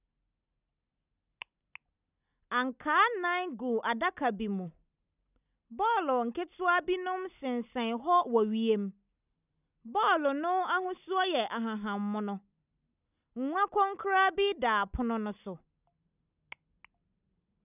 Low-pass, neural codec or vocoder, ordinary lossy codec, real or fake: 3.6 kHz; none; none; real